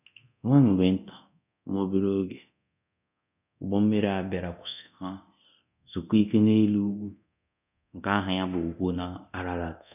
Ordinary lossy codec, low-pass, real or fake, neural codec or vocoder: none; 3.6 kHz; fake; codec, 24 kHz, 0.9 kbps, DualCodec